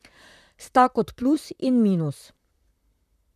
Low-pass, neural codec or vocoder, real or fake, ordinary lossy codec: 14.4 kHz; vocoder, 44.1 kHz, 128 mel bands, Pupu-Vocoder; fake; none